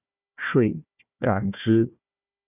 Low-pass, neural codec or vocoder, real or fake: 3.6 kHz; codec, 16 kHz, 1 kbps, FunCodec, trained on Chinese and English, 50 frames a second; fake